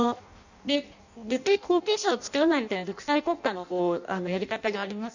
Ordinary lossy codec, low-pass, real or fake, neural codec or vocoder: none; 7.2 kHz; fake; codec, 16 kHz in and 24 kHz out, 0.6 kbps, FireRedTTS-2 codec